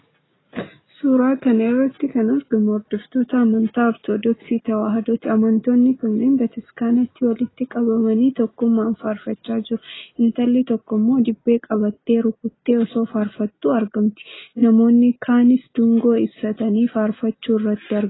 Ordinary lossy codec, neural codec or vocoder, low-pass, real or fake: AAC, 16 kbps; none; 7.2 kHz; real